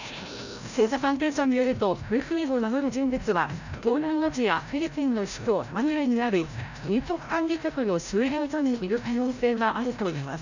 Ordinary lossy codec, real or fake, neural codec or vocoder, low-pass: none; fake; codec, 16 kHz, 0.5 kbps, FreqCodec, larger model; 7.2 kHz